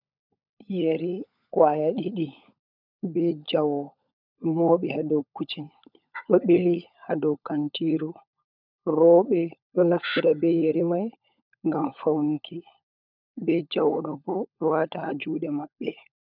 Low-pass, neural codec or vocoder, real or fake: 5.4 kHz; codec, 16 kHz, 16 kbps, FunCodec, trained on LibriTTS, 50 frames a second; fake